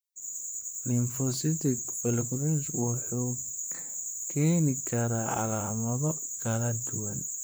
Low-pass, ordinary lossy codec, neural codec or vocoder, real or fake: none; none; vocoder, 44.1 kHz, 128 mel bands, Pupu-Vocoder; fake